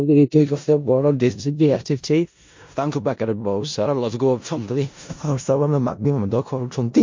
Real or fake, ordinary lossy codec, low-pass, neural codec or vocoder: fake; MP3, 48 kbps; 7.2 kHz; codec, 16 kHz in and 24 kHz out, 0.4 kbps, LongCat-Audio-Codec, four codebook decoder